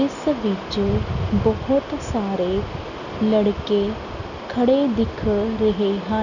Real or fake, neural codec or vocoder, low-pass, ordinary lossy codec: real; none; 7.2 kHz; none